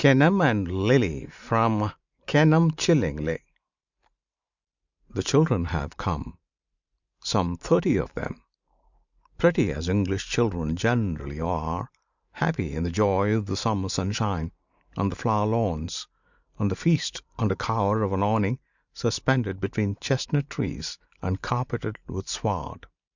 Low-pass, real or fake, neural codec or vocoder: 7.2 kHz; fake; vocoder, 44.1 kHz, 128 mel bands every 512 samples, BigVGAN v2